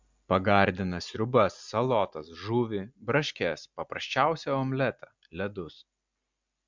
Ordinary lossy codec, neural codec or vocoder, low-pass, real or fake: MP3, 64 kbps; none; 7.2 kHz; real